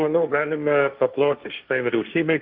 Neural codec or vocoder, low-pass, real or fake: codec, 16 kHz, 1.1 kbps, Voila-Tokenizer; 5.4 kHz; fake